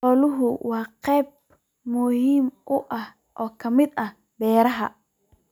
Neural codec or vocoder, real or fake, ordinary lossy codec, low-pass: none; real; none; 19.8 kHz